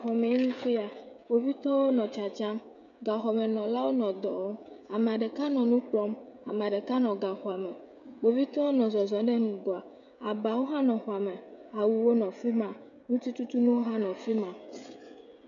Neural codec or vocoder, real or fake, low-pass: codec, 16 kHz, 16 kbps, FreqCodec, smaller model; fake; 7.2 kHz